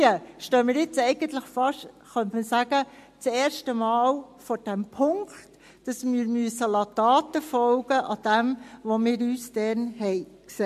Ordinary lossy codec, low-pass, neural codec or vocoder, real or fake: MP3, 64 kbps; 14.4 kHz; none; real